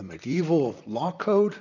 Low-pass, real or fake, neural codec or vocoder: 7.2 kHz; fake; vocoder, 44.1 kHz, 128 mel bands, Pupu-Vocoder